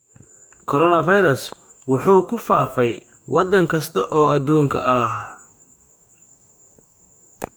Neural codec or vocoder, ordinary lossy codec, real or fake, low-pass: codec, 44.1 kHz, 2.6 kbps, DAC; none; fake; none